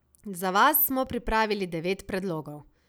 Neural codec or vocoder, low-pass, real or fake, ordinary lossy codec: none; none; real; none